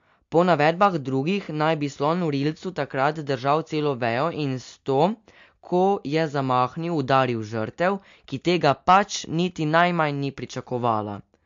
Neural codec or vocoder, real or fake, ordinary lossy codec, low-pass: none; real; MP3, 48 kbps; 7.2 kHz